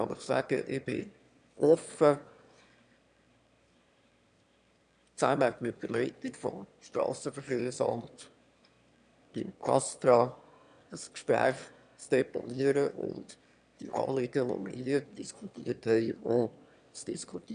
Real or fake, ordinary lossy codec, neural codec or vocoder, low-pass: fake; none; autoencoder, 22.05 kHz, a latent of 192 numbers a frame, VITS, trained on one speaker; 9.9 kHz